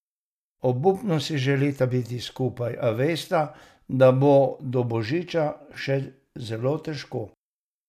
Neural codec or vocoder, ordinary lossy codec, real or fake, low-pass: none; none; real; 14.4 kHz